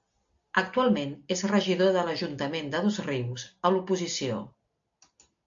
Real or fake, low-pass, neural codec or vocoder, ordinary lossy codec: real; 7.2 kHz; none; AAC, 64 kbps